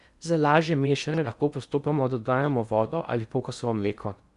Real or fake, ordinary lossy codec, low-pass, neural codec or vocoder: fake; Opus, 64 kbps; 10.8 kHz; codec, 16 kHz in and 24 kHz out, 0.8 kbps, FocalCodec, streaming, 65536 codes